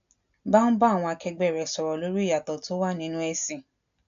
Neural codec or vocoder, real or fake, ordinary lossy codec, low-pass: none; real; AAC, 64 kbps; 7.2 kHz